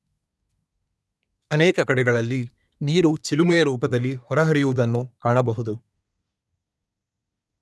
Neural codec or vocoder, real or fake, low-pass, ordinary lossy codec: codec, 24 kHz, 1 kbps, SNAC; fake; none; none